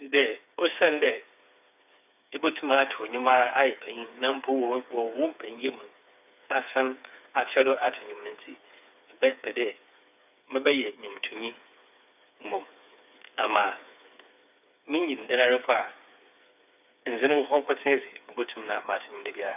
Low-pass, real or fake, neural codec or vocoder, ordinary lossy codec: 3.6 kHz; fake; codec, 16 kHz, 4 kbps, FreqCodec, smaller model; none